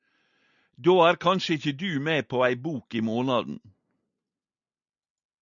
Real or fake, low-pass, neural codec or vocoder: real; 7.2 kHz; none